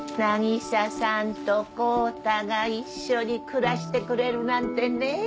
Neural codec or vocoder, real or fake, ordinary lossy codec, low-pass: none; real; none; none